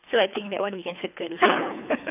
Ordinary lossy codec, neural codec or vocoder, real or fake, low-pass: none; codec, 24 kHz, 3 kbps, HILCodec; fake; 3.6 kHz